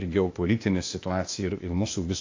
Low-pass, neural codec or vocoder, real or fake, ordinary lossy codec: 7.2 kHz; codec, 16 kHz, 0.8 kbps, ZipCodec; fake; AAC, 48 kbps